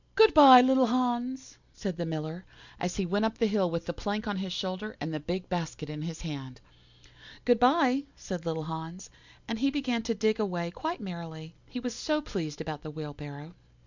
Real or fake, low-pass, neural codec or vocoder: real; 7.2 kHz; none